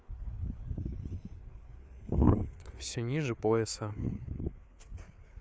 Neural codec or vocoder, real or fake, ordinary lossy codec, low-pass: codec, 16 kHz, 4 kbps, FreqCodec, larger model; fake; none; none